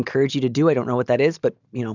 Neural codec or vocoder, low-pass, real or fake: none; 7.2 kHz; real